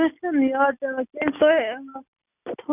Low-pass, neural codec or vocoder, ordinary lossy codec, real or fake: 3.6 kHz; none; none; real